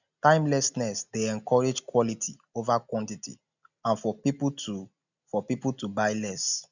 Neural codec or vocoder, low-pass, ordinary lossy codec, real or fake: none; 7.2 kHz; none; real